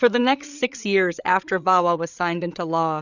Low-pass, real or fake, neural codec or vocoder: 7.2 kHz; fake; codec, 16 kHz, 8 kbps, FreqCodec, larger model